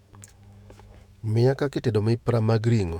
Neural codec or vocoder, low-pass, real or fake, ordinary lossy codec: autoencoder, 48 kHz, 128 numbers a frame, DAC-VAE, trained on Japanese speech; 19.8 kHz; fake; none